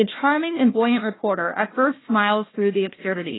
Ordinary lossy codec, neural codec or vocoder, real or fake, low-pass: AAC, 16 kbps; codec, 16 kHz, 1 kbps, FunCodec, trained on Chinese and English, 50 frames a second; fake; 7.2 kHz